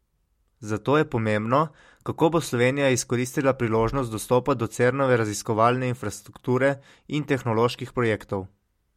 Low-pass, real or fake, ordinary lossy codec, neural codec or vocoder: 19.8 kHz; real; MP3, 64 kbps; none